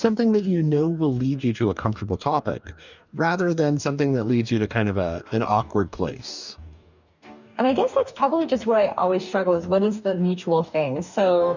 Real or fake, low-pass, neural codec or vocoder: fake; 7.2 kHz; codec, 44.1 kHz, 2.6 kbps, DAC